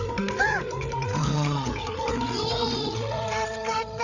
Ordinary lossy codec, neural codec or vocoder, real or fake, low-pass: none; codec, 16 kHz, 8 kbps, FreqCodec, larger model; fake; 7.2 kHz